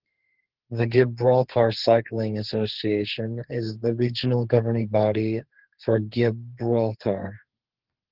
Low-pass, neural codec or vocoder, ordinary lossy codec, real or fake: 5.4 kHz; codec, 32 kHz, 1.9 kbps, SNAC; Opus, 16 kbps; fake